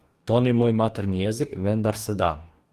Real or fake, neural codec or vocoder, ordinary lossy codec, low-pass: fake; codec, 44.1 kHz, 2.6 kbps, DAC; Opus, 24 kbps; 14.4 kHz